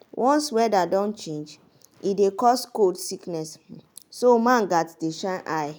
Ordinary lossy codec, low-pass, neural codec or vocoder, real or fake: none; none; none; real